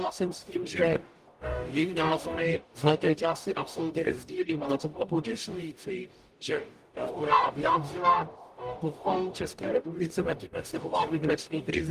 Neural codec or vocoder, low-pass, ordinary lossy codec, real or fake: codec, 44.1 kHz, 0.9 kbps, DAC; 14.4 kHz; Opus, 32 kbps; fake